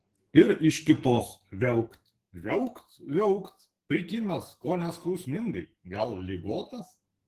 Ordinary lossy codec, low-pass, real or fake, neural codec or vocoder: Opus, 16 kbps; 14.4 kHz; fake; codec, 44.1 kHz, 2.6 kbps, SNAC